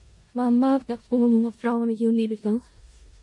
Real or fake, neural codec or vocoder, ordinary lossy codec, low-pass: fake; codec, 16 kHz in and 24 kHz out, 0.4 kbps, LongCat-Audio-Codec, four codebook decoder; MP3, 48 kbps; 10.8 kHz